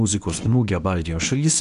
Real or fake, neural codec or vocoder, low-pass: fake; codec, 24 kHz, 0.9 kbps, WavTokenizer, medium speech release version 1; 10.8 kHz